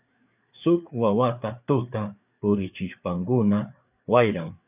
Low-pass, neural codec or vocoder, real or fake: 3.6 kHz; codec, 16 kHz, 4 kbps, FreqCodec, larger model; fake